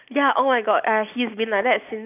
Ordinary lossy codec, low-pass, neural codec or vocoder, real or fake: none; 3.6 kHz; none; real